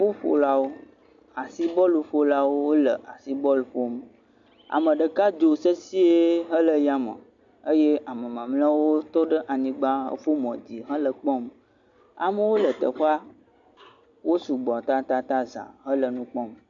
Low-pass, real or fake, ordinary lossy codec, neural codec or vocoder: 7.2 kHz; real; AAC, 64 kbps; none